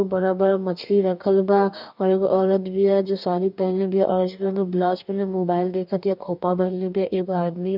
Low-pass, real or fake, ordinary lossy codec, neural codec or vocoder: 5.4 kHz; fake; none; codec, 44.1 kHz, 2.6 kbps, DAC